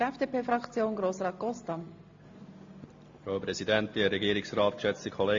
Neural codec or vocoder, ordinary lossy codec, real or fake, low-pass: none; AAC, 48 kbps; real; 7.2 kHz